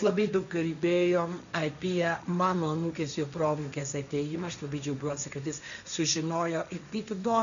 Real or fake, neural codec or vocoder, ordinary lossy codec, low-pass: fake; codec, 16 kHz, 1.1 kbps, Voila-Tokenizer; MP3, 96 kbps; 7.2 kHz